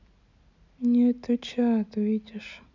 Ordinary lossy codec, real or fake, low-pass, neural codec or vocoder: none; real; 7.2 kHz; none